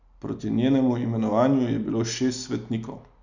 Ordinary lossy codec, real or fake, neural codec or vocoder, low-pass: none; real; none; 7.2 kHz